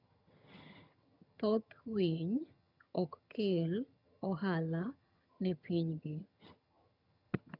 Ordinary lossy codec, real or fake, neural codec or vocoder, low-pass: none; fake; vocoder, 22.05 kHz, 80 mel bands, HiFi-GAN; 5.4 kHz